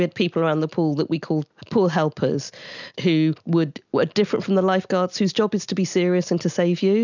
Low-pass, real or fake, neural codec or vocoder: 7.2 kHz; real; none